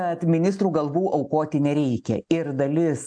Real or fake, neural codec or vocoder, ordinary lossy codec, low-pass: real; none; AAC, 64 kbps; 9.9 kHz